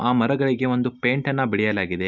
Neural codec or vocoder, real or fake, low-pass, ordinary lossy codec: none; real; 7.2 kHz; none